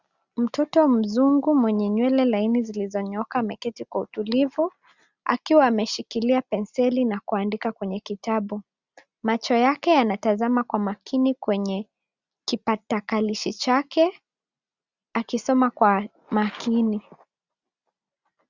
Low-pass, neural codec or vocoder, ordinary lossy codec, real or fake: 7.2 kHz; none; Opus, 64 kbps; real